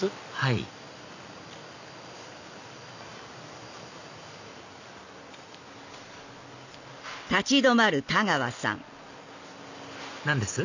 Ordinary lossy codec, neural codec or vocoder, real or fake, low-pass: none; none; real; 7.2 kHz